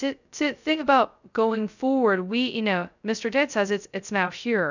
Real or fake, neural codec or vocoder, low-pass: fake; codec, 16 kHz, 0.2 kbps, FocalCodec; 7.2 kHz